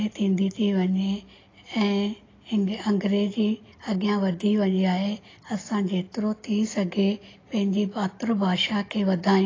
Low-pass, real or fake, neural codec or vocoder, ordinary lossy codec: 7.2 kHz; real; none; AAC, 32 kbps